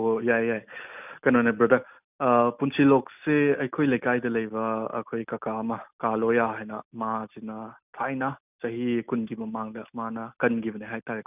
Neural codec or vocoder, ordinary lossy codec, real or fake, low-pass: none; none; real; 3.6 kHz